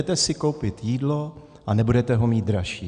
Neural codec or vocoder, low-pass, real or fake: none; 9.9 kHz; real